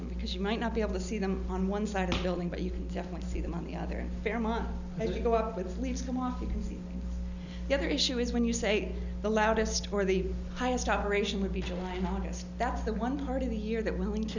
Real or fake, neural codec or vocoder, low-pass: real; none; 7.2 kHz